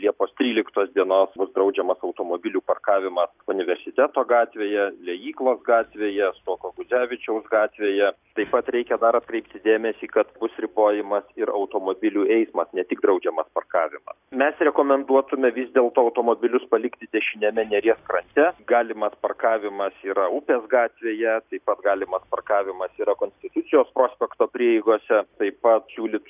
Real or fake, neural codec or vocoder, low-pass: real; none; 3.6 kHz